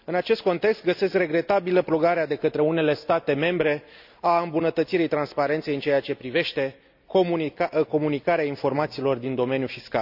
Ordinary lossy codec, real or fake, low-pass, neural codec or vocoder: none; real; 5.4 kHz; none